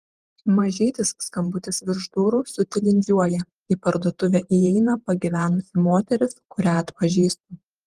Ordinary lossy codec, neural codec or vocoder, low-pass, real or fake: Opus, 24 kbps; vocoder, 48 kHz, 128 mel bands, Vocos; 14.4 kHz; fake